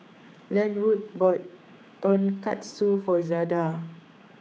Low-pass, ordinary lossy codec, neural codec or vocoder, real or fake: none; none; codec, 16 kHz, 4 kbps, X-Codec, HuBERT features, trained on general audio; fake